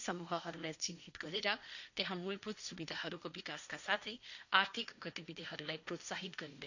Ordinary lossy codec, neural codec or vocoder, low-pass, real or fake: none; codec, 16 kHz, 1.1 kbps, Voila-Tokenizer; 7.2 kHz; fake